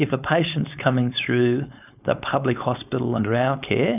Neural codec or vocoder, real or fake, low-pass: codec, 16 kHz, 4.8 kbps, FACodec; fake; 3.6 kHz